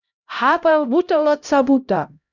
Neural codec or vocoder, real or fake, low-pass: codec, 16 kHz, 0.5 kbps, X-Codec, HuBERT features, trained on LibriSpeech; fake; 7.2 kHz